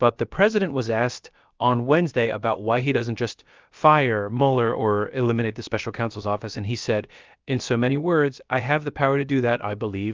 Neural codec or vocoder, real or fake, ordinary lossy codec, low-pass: codec, 16 kHz, about 1 kbps, DyCAST, with the encoder's durations; fake; Opus, 24 kbps; 7.2 kHz